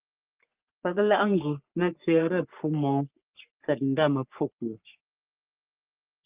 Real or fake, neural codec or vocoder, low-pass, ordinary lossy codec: fake; codec, 44.1 kHz, 3.4 kbps, Pupu-Codec; 3.6 kHz; Opus, 24 kbps